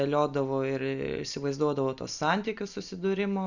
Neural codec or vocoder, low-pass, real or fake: none; 7.2 kHz; real